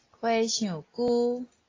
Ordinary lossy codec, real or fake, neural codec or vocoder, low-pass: AAC, 32 kbps; real; none; 7.2 kHz